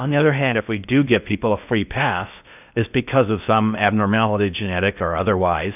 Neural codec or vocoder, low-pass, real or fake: codec, 16 kHz in and 24 kHz out, 0.6 kbps, FocalCodec, streaming, 4096 codes; 3.6 kHz; fake